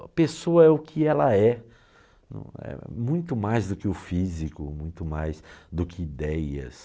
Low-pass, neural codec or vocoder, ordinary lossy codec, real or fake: none; none; none; real